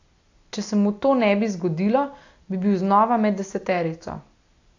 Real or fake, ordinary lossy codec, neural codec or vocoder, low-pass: real; AAC, 48 kbps; none; 7.2 kHz